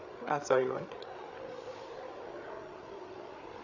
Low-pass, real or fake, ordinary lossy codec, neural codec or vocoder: 7.2 kHz; fake; none; codec, 16 kHz, 8 kbps, FreqCodec, larger model